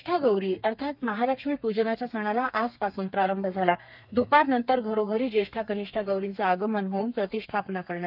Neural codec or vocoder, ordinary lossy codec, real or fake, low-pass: codec, 32 kHz, 1.9 kbps, SNAC; none; fake; 5.4 kHz